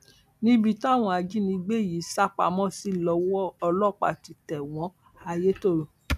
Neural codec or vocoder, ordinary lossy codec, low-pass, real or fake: none; none; 14.4 kHz; real